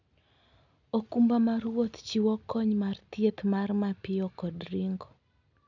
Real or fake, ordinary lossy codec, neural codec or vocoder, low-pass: real; none; none; 7.2 kHz